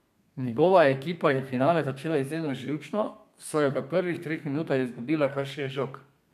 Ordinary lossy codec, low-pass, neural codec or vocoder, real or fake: none; 14.4 kHz; codec, 32 kHz, 1.9 kbps, SNAC; fake